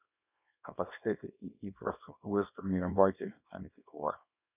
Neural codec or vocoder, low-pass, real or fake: codec, 24 kHz, 0.9 kbps, WavTokenizer, small release; 3.6 kHz; fake